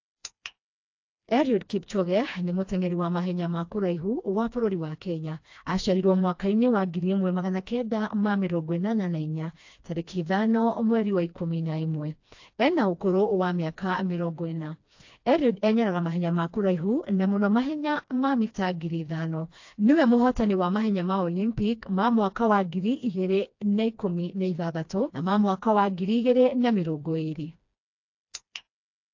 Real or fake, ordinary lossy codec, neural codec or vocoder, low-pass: fake; AAC, 48 kbps; codec, 16 kHz, 2 kbps, FreqCodec, smaller model; 7.2 kHz